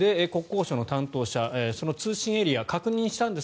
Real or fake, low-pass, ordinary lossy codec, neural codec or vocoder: real; none; none; none